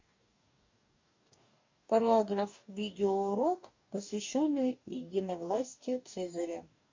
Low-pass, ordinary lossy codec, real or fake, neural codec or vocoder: 7.2 kHz; MP3, 48 kbps; fake; codec, 44.1 kHz, 2.6 kbps, DAC